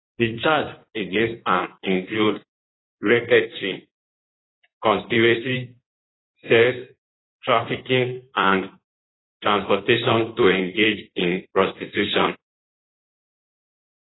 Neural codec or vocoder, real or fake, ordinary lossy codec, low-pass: codec, 16 kHz in and 24 kHz out, 1.1 kbps, FireRedTTS-2 codec; fake; AAC, 16 kbps; 7.2 kHz